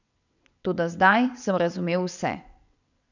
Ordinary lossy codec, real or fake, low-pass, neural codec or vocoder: none; fake; 7.2 kHz; vocoder, 22.05 kHz, 80 mel bands, WaveNeXt